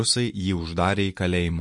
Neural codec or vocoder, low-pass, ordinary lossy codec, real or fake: none; 10.8 kHz; MP3, 48 kbps; real